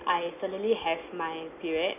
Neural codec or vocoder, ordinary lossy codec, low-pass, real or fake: none; none; 3.6 kHz; real